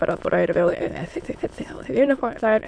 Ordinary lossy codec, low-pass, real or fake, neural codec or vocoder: AAC, 64 kbps; 9.9 kHz; fake; autoencoder, 22.05 kHz, a latent of 192 numbers a frame, VITS, trained on many speakers